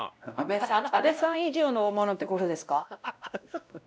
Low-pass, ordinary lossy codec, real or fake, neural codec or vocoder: none; none; fake; codec, 16 kHz, 1 kbps, X-Codec, WavLM features, trained on Multilingual LibriSpeech